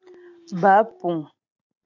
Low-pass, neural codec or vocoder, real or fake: 7.2 kHz; none; real